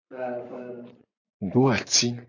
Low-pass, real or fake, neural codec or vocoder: 7.2 kHz; fake; vocoder, 24 kHz, 100 mel bands, Vocos